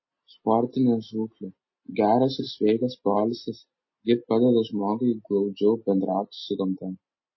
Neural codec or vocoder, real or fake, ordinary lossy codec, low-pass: none; real; MP3, 24 kbps; 7.2 kHz